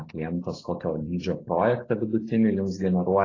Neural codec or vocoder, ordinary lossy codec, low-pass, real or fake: none; AAC, 32 kbps; 7.2 kHz; real